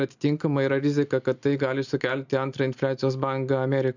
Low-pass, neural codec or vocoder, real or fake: 7.2 kHz; none; real